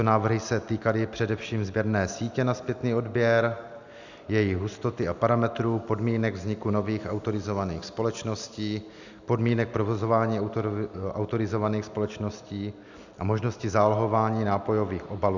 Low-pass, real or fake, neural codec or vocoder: 7.2 kHz; real; none